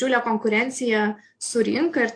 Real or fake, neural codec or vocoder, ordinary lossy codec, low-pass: real; none; AAC, 48 kbps; 9.9 kHz